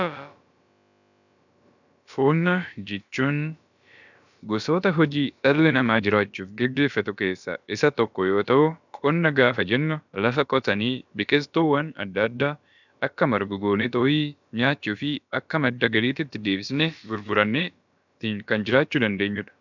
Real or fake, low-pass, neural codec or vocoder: fake; 7.2 kHz; codec, 16 kHz, about 1 kbps, DyCAST, with the encoder's durations